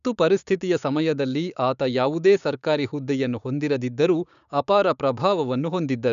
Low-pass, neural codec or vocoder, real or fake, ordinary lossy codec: 7.2 kHz; codec, 16 kHz, 6 kbps, DAC; fake; none